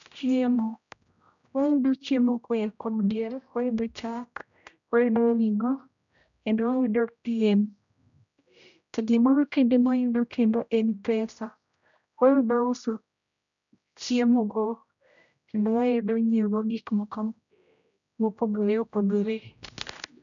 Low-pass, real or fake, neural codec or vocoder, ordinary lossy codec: 7.2 kHz; fake; codec, 16 kHz, 0.5 kbps, X-Codec, HuBERT features, trained on general audio; none